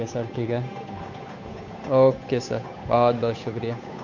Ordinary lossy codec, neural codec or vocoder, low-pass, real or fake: MP3, 48 kbps; codec, 16 kHz, 8 kbps, FunCodec, trained on Chinese and English, 25 frames a second; 7.2 kHz; fake